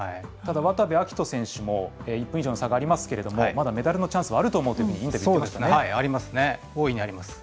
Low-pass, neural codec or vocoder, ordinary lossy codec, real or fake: none; none; none; real